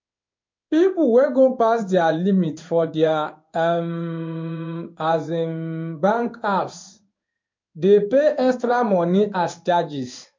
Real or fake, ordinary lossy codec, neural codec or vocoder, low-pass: fake; MP3, 48 kbps; codec, 16 kHz in and 24 kHz out, 1 kbps, XY-Tokenizer; 7.2 kHz